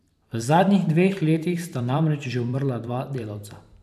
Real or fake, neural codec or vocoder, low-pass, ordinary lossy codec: fake; vocoder, 44.1 kHz, 128 mel bands every 512 samples, BigVGAN v2; 14.4 kHz; none